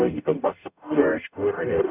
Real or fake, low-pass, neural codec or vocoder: fake; 3.6 kHz; codec, 44.1 kHz, 0.9 kbps, DAC